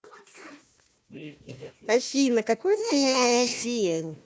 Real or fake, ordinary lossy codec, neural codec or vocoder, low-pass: fake; none; codec, 16 kHz, 1 kbps, FunCodec, trained on Chinese and English, 50 frames a second; none